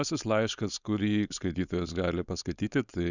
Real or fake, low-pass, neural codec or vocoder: fake; 7.2 kHz; codec, 16 kHz, 4.8 kbps, FACodec